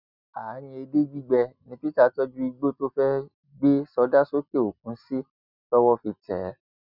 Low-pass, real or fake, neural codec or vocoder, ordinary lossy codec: 5.4 kHz; real; none; none